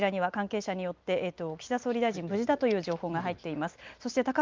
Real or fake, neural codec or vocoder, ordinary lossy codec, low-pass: real; none; Opus, 32 kbps; 7.2 kHz